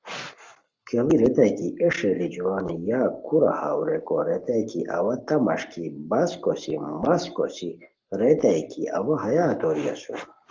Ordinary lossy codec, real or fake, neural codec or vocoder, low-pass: Opus, 32 kbps; fake; codec, 44.1 kHz, 7.8 kbps, Pupu-Codec; 7.2 kHz